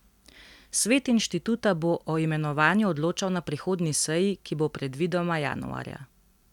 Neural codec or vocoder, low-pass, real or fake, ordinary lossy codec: none; 19.8 kHz; real; none